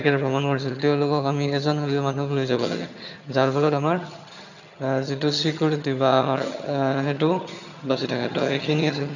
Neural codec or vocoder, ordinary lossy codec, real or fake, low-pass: vocoder, 22.05 kHz, 80 mel bands, HiFi-GAN; none; fake; 7.2 kHz